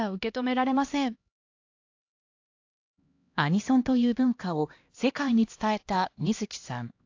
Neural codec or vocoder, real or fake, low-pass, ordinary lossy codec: codec, 16 kHz, 1 kbps, X-Codec, HuBERT features, trained on LibriSpeech; fake; 7.2 kHz; AAC, 48 kbps